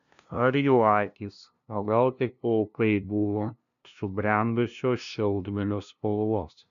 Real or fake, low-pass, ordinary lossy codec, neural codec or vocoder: fake; 7.2 kHz; MP3, 96 kbps; codec, 16 kHz, 0.5 kbps, FunCodec, trained on LibriTTS, 25 frames a second